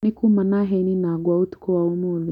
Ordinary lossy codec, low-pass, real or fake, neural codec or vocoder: none; 19.8 kHz; real; none